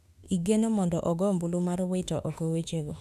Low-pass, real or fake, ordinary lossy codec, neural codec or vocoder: 14.4 kHz; fake; none; autoencoder, 48 kHz, 32 numbers a frame, DAC-VAE, trained on Japanese speech